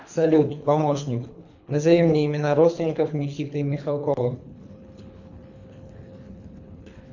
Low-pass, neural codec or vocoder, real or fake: 7.2 kHz; codec, 24 kHz, 3 kbps, HILCodec; fake